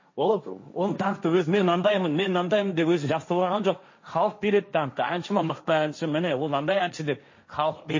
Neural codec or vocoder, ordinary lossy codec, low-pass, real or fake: codec, 16 kHz, 1.1 kbps, Voila-Tokenizer; MP3, 32 kbps; 7.2 kHz; fake